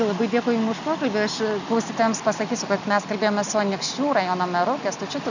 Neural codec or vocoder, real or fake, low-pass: none; real; 7.2 kHz